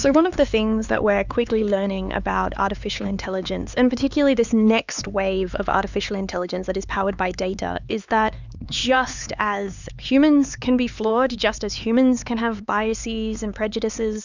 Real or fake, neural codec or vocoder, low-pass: fake; codec, 16 kHz, 4 kbps, X-Codec, HuBERT features, trained on LibriSpeech; 7.2 kHz